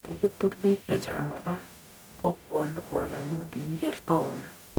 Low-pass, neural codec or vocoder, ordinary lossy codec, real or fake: none; codec, 44.1 kHz, 0.9 kbps, DAC; none; fake